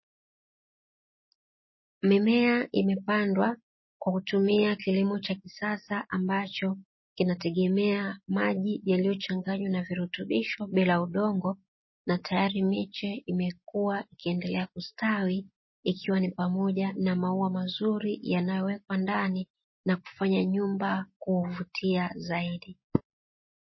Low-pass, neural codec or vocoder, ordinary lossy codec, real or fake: 7.2 kHz; none; MP3, 24 kbps; real